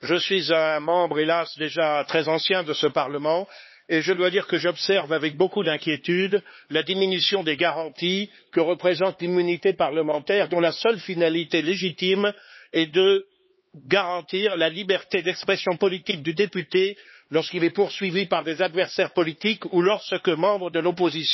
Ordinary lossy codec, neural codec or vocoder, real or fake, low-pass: MP3, 24 kbps; codec, 16 kHz, 4 kbps, X-Codec, HuBERT features, trained on LibriSpeech; fake; 7.2 kHz